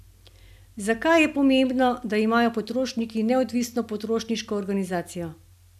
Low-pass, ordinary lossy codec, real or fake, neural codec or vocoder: 14.4 kHz; none; real; none